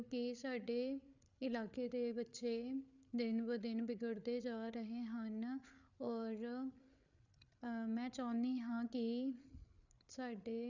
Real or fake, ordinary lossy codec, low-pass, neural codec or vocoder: fake; none; 7.2 kHz; codec, 16 kHz, 8 kbps, FreqCodec, larger model